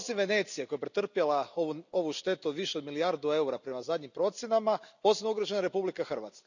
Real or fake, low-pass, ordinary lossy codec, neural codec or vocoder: real; 7.2 kHz; none; none